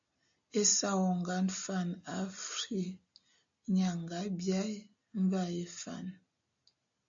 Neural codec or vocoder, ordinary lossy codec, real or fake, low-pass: none; AAC, 64 kbps; real; 7.2 kHz